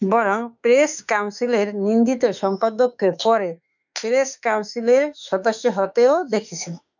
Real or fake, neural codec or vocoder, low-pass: fake; autoencoder, 48 kHz, 32 numbers a frame, DAC-VAE, trained on Japanese speech; 7.2 kHz